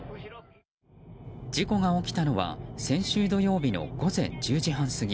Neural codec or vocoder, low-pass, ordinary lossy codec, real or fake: none; none; none; real